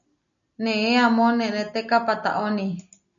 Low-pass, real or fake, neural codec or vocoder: 7.2 kHz; real; none